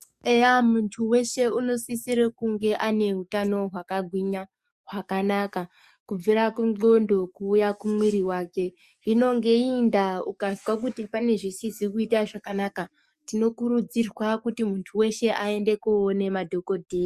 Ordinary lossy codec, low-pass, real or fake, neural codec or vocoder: Opus, 64 kbps; 14.4 kHz; fake; codec, 44.1 kHz, 7.8 kbps, DAC